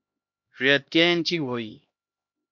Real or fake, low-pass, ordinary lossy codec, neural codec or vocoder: fake; 7.2 kHz; MP3, 48 kbps; codec, 16 kHz, 1 kbps, X-Codec, HuBERT features, trained on LibriSpeech